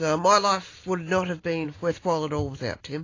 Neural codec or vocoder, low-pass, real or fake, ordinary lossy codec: none; 7.2 kHz; real; AAC, 32 kbps